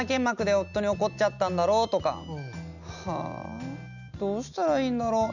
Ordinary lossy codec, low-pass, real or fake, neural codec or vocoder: none; 7.2 kHz; real; none